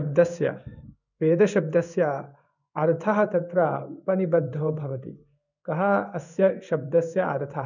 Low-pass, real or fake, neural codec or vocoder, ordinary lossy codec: 7.2 kHz; fake; codec, 16 kHz in and 24 kHz out, 1 kbps, XY-Tokenizer; none